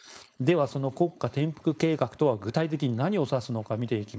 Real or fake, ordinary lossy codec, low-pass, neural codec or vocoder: fake; none; none; codec, 16 kHz, 4.8 kbps, FACodec